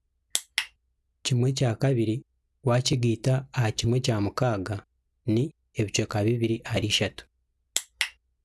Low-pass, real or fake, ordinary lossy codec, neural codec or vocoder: none; real; none; none